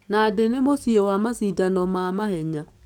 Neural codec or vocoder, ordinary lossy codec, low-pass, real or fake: codec, 44.1 kHz, 7.8 kbps, DAC; none; 19.8 kHz; fake